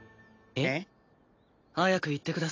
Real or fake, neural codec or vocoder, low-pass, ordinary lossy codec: real; none; 7.2 kHz; AAC, 32 kbps